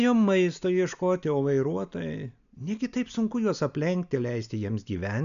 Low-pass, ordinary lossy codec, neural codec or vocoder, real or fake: 7.2 kHz; MP3, 96 kbps; none; real